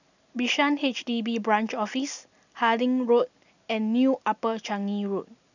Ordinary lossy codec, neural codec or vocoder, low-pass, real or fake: none; none; 7.2 kHz; real